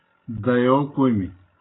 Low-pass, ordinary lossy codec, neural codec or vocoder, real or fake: 7.2 kHz; AAC, 16 kbps; none; real